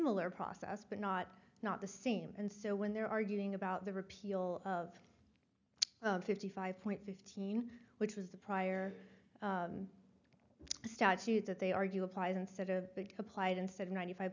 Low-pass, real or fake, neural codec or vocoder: 7.2 kHz; real; none